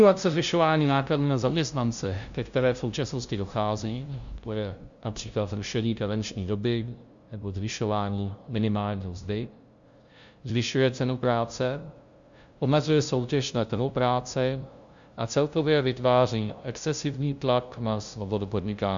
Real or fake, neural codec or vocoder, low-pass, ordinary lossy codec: fake; codec, 16 kHz, 0.5 kbps, FunCodec, trained on LibriTTS, 25 frames a second; 7.2 kHz; Opus, 64 kbps